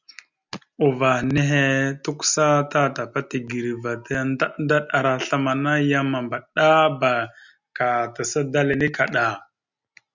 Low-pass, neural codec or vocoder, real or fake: 7.2 kHz; none; real